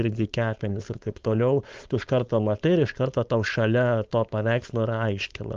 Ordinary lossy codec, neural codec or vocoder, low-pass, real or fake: Opus, 24 kbps; codec, 16 kHz, 4.8 kbps, FACodec; 7.2 kHz; fake